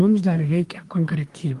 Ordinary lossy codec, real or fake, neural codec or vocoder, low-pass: Opus, 24 kbps; fake; codec, 24 kHz, 1 kbps, SNAC; 10.8 kHz